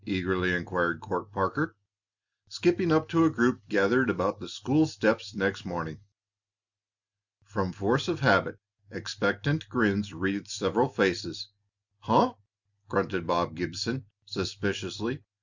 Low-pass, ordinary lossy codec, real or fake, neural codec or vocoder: 7.2 kHz; Opus, 64 kbps; real; none